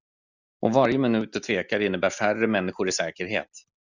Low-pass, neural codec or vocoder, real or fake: 7.2 kHz; none; real